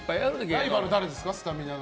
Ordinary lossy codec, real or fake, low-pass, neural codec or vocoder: none; real; none; none